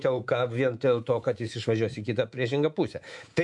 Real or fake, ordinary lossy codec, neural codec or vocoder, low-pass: fake; MP3, 64 kbps; codec, 24 kHz, 3.1 kbps, DualCodec; 10.8 kHz